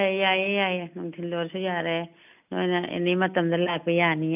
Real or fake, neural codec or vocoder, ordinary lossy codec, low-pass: real; none; none; 3.6 kHz